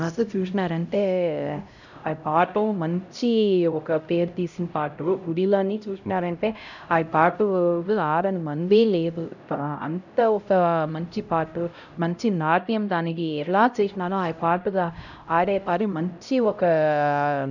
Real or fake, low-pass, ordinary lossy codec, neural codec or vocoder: fake; 7.2 kHz; none; codec, 16 kHz, 0.5 kbps, X-Codec, HuBERT features, trained on LibriSpeech